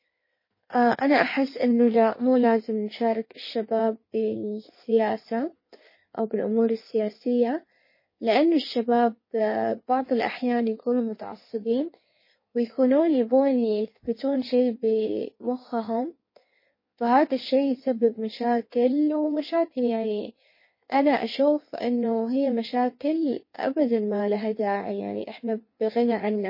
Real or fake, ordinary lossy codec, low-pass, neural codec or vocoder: fake; MP3, 24 kbps; 5.4 kHz; codec, 16 kHz in and 24 kHz out, 1.1 kbps, FireRedTTS-2 codec